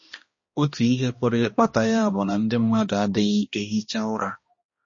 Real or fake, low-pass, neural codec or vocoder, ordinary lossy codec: fake; 7.2 kHz; codec, 16 kHz, 1 kbps, X-Codec, HuBERT features, trained on balanced general audio; MP3, 32 kbps